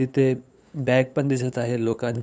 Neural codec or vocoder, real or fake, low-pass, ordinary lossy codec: codec, 16 kHz, 8 kbps, FunCodec, trained on LibriTTS, 25 frames a second; fake; none; none